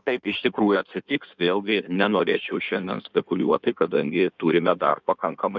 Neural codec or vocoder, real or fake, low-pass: codec, 16 kHz in and 24 kHz out, 1.1 kbps, FireRedTTS-2 codec; fake; 7.2 kHz